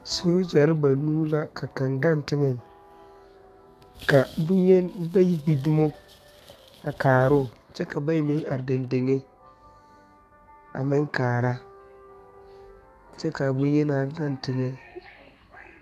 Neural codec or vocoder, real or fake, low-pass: codec, 32 kHz, 1.9 kbps, SNAC; fake; 14.4 kHz